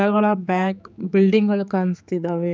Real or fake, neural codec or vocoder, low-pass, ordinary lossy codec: fake; codec, 16 kHz, 4 kbps, X-Codec, HuBERT features, trained on general audio; none; none